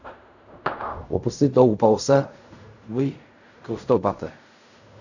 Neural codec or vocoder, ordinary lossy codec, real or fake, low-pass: codec, 16 kHz in and 24 kHz out, 0.4 kbps, LongCat-Audio-Codec, fine tuned four codebook decoder; none; fake; 7.2 kHz